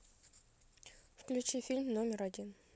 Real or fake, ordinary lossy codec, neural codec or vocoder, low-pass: real; none; none; none